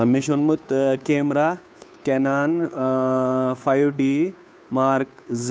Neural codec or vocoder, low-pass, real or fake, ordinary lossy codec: codec, 16 kHz, 2 kbps, FunCodec, trained on Chinese and English, 25 frames a second; none; fake; none